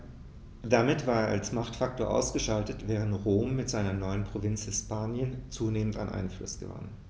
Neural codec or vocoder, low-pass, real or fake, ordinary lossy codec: none; none; real; none